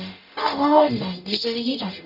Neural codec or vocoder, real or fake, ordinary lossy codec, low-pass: codec, 44.1 kHz, 0.9 kbps, DAC; fake; none; 5.4 kHz